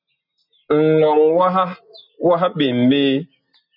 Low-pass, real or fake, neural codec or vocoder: 5.4 kHz; real; none